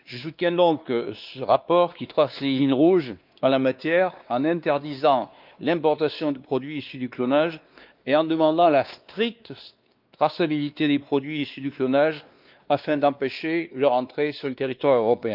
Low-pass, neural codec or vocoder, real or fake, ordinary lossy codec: 5.4 kHz; codec, 16 kHz, 2 kbps, X-Codec, WavLM features, trained on Multilingual LibriSpeech; fake; Opus, 24 kbps